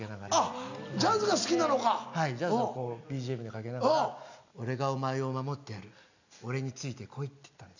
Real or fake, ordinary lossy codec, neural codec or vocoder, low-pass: real; none; none; 7.2 kHz